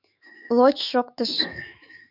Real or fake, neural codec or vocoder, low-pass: fake; autoencoder, 48 kHz, 128 numbers a frame, DAC-VAE, trained on Japanese speech; 5.4 kHz